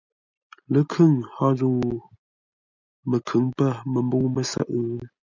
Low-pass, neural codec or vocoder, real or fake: 7.2 kHz; none; real